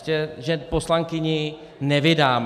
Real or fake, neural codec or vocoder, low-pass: real; none; 14.4 kHz